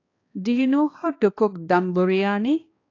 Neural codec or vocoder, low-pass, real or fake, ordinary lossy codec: codec, 16 kHz, 2 kbps, X-Codec, HuBERT features, trained on balanced general audio; 7.2 kHz; fake; AAC, 32 kbps